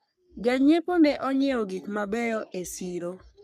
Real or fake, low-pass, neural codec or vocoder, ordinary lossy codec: fake; 14.4 kHz; codec, 44.1 kHz, 3.4 kbps, Pupu-Codec; none